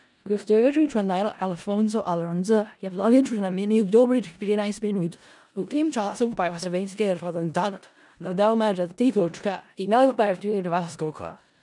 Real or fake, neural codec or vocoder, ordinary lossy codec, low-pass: fake; codec, 16 kHz in and 24 kHz out, 0.4 kbps, LongCat-Audio-Codec, four codebook decoder; none; 10.8 kHz